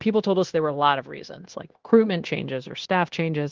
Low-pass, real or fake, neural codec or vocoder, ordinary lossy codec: 7.2 kHz; fake; codec, 16 kHz, 0.9 kbps, LongCat-Audio-Codec; Opus, 16 kbps